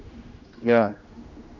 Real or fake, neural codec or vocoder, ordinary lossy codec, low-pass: fake; codec, 16 kHz, 1 kbps, X-Codec, HuBERT features, trained on general audio; none; 7.2 kHz